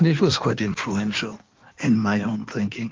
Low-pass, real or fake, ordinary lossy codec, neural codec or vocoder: 7.2 kHz; fake; Opus, 32 kbps; codec, 16 kHz in and 24 kHz out, 1.1 kbps, FireRedTTS-2 codec